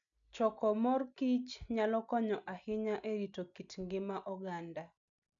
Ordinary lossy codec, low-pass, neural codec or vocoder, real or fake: none; 7.2 kHz; none; real